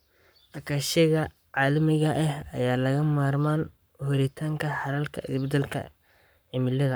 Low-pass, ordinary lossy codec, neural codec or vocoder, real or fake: none; none; codec, 44.1 kHz, 7.8 kbps, Pupu-Codec; fake